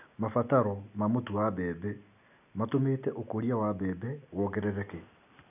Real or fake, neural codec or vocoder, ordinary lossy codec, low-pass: real; none; none; 3.6 kHz